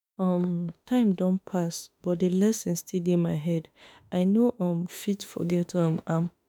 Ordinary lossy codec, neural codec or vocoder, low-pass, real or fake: none; autoencoder, 48 kHz, 32 numbers a frame, DAC-VAE, trained on Japanese speech; none; fake